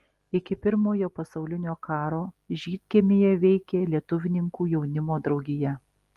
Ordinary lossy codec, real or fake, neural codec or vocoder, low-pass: Opus, 24 kbps; real; none; 14.4 kHz